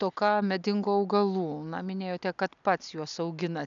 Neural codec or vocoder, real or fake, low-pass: none; real; 7.2 kHz